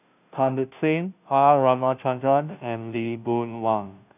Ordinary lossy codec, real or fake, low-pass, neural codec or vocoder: none; fake; 3.6 kHz; codec, 16 kHz, 0.5 kbps, FunCodec, trained on Chinese and English, 25 frames a second